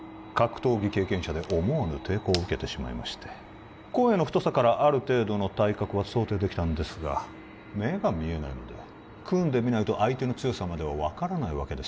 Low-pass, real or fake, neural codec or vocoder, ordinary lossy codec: none; real; none; none